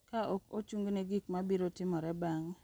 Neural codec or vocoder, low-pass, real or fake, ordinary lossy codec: none; none; real; none